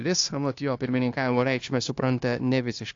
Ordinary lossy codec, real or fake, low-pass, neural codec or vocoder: AAC, 48 kbps; fake; 7.2 kHz; codec, 16 kHz, 0.9 kbps, LongCat-Audio-Codec